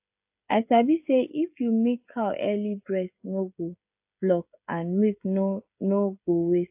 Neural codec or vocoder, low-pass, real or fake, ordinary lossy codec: codec, 16 kHz, 16 kbps, FreqCodec, smaller model; 3.6 kHz; fake; AAC, 32 kbps